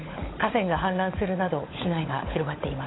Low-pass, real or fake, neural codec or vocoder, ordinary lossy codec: 7.2 kHz; fake; codec, 16 kHz, 16 kbps, FunCodec, trained on LibriTTS, 50 frames a second; AAC, 16 kbps